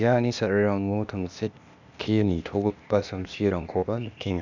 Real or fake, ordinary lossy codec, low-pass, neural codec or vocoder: fake; none; 7.2 kHz; codec, 16 kHz, 0.8 kbps, ZipCodec